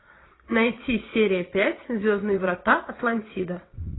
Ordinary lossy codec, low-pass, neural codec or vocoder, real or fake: AAC, 16 kbps; 7.2 kHz; vocoder, 22.05 kHz, 80 mel bands, WaveNeXt; fake